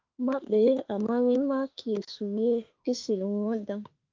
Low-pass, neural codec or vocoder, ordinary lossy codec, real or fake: 7.2 kHz; autoencoder, 48 kHz, 32 numbers a frame, DAC-VAE, trained on Japanese speech; Opus, 24 kbps; fake